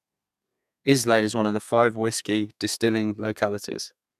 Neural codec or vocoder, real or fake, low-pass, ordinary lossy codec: codec, 32 kHz, 1.9 kbps, SNAC; fake; 14.4 kHz; none